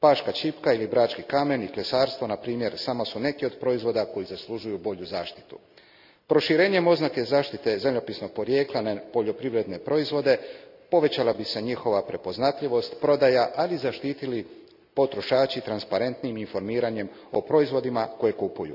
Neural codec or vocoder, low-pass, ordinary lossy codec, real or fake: none; 5.4 kHz; none; real